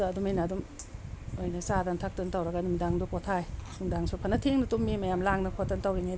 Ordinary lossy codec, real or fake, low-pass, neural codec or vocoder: none; real; none; none